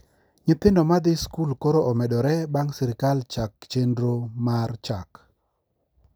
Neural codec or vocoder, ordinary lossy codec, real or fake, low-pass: none; none; real; none